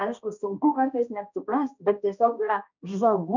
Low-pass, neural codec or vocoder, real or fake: 7.2 kHz; codec, 16 kHz, 1 kbps, X-Codec, HuBERT features, trained on balanced general audio; fake